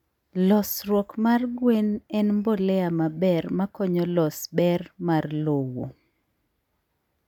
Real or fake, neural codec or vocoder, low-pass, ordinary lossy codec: real; none; 19.8 kHz; none